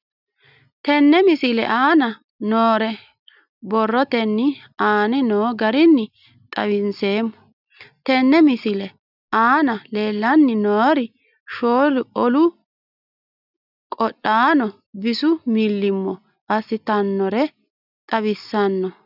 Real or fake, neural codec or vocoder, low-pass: real; none; 5.4 kHz